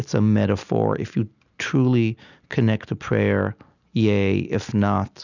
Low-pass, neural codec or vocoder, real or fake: 7.2 kHz; none; real